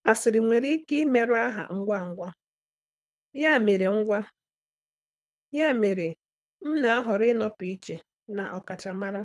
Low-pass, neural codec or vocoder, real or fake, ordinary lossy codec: none; codec, 24 kHz, 3 kbps, HILCodec; fake; none